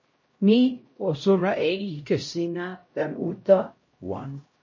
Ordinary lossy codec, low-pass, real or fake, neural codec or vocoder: MP3, 32 kbps; 7.2 kHz; fake; codec, 16 kHz, 0.5 kbps, X-Codec, HuBERT features, trained on LibriSpeech